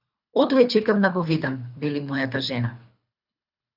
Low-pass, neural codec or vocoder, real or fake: 5.4 kHz; codec, 24 kHz, 3 kbps, HILCodec; fake